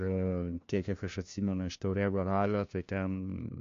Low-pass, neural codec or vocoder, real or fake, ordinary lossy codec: 7.2 kHz; codec, 16 kHz, 1 kbps, FunCodec, trained on LibriTTS, 50 frames a second; fake; MP3, 48 kbps